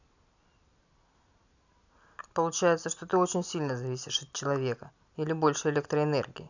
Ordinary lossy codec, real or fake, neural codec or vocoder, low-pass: none; real; none; 7.2 kHz